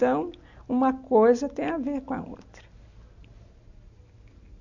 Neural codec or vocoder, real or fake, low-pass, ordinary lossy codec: none; real; 7.2 kHz; none